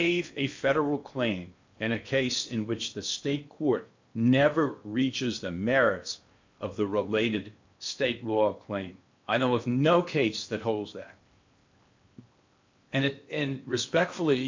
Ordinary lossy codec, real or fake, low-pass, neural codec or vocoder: MP3, 64 kbps; fake; 7.2 kHz; codec, 16 kHz in and 24 kHz out, 0.8 kbps, FocalCodec, streaming, 65536 codes